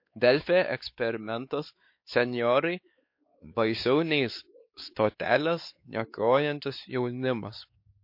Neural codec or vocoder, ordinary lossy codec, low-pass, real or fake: codec, 16 kHz, 4 kbps, X-Codec, WavLM features, trained on Multilingual LibriSpeech; MP3, 32 kbps; 5.4 kHz; fake